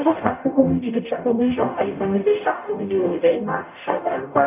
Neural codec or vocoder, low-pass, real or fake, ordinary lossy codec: codec, 44.1 kHz, 0.9 kbps, DAC; 3.6 kHz; fake; none